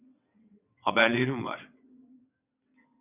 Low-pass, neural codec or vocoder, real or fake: 3.6 kHz; vocoder, 22.05 kHz, 80 mel bands, WaveNeXt; fake